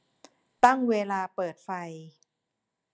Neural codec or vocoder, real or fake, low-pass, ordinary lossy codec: none; real; none; none